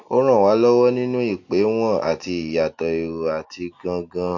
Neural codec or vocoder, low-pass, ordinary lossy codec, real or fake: none; 7.2 kHz; AAC, 48 kbps; real